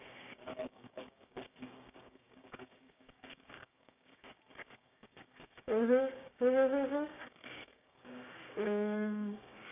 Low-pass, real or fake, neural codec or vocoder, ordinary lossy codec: 3.6 kHz; fake; codec, 16 kHz, 6 kbps, DAC; none